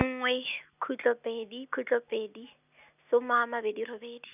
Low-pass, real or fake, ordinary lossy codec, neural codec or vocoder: 3.6 kHz; real; none; none